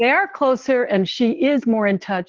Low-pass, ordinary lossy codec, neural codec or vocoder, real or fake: 7.2 kHz; Opus, 16 kbps; none; real